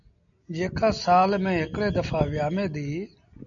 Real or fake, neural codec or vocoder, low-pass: real; none; 7.2 kHz